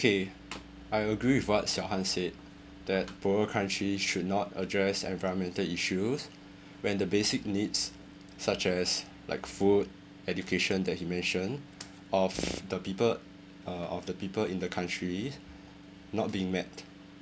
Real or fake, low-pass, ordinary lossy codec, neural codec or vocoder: real; none; none; none